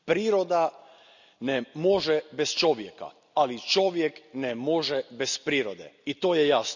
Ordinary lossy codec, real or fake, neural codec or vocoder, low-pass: none; real; none; 7.2 kHz